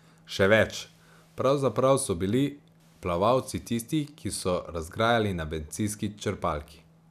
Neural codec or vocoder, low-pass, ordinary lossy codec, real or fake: none; 14.4 kHz; none; real